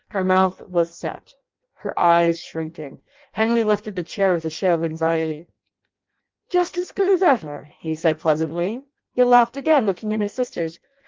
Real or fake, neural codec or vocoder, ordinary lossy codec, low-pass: fake; codec, 16 kHz in and 24 kHz out, 0.6 kbps, FireRedTTS-2 codec; Opus, 32 kbps; 7.2 kHz